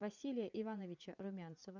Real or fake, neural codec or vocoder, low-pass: fake; vocoder, 44.1 kHz, 80 mel bands, Vocos; 7.2 kHz